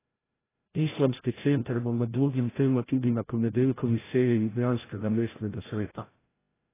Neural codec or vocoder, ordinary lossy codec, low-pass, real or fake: codec, 16 kHz, 0.5 kbps, FreqCodec, larger model; AAC, 16 kbps; 3.6 kHz; fake